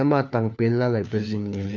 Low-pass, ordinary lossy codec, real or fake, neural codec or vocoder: none; none; fake; codec, 16 kHz, 4 kbps, FunCodec, trained on LibriTTS, 50 frames a second